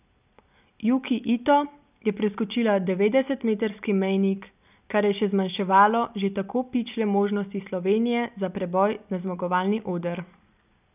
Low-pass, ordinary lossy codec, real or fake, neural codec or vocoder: 3.6 kHz; none; real; none